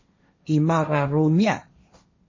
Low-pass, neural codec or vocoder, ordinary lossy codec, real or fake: 7.2 kHz; codec, 16 kHz, 1.1 kbps, Voila-Tokenizer; MP3, 32 kbps; fake